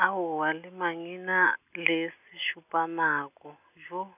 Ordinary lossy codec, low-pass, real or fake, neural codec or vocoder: none; 3.6 kHz; real; none